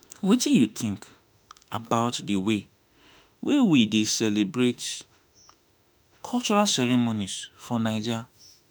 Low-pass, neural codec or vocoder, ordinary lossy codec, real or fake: none; autoencoder, 48 kHz, 32 numbers a frame, DAC-VAE, trained on Japanese speech; none; fake